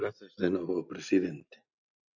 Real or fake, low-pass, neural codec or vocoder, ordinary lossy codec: real; 7.2 kHz; none; AAC, 48 kbps